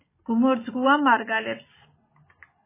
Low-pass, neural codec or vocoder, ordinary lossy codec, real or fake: 3.6 kHz; none; MP3, 16 kbps; real